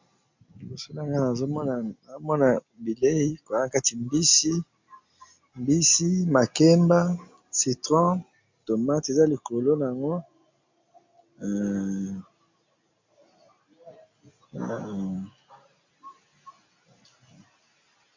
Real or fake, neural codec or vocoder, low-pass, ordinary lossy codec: real; none; 7.2 kHz; MP3, 64 kbps